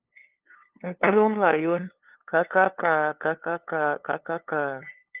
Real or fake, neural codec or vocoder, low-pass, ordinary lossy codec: fake; codec, 16 kHz, 2 kbps, FunCodec, trained on LibriTTS, 25 frames a second; 3.6 kHz; Opus, 24 kbps